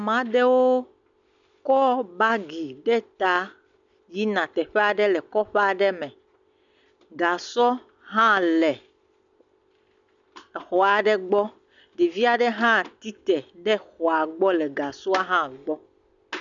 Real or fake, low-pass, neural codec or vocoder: real; 7.2 kHz; none